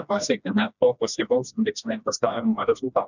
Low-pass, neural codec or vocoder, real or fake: 7.2 kHz; codec, 16 kHz, 1 kbps, FreqCodec, smaller model; fake